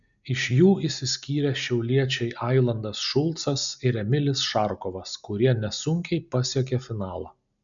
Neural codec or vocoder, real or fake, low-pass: none; real; 7.2 kHz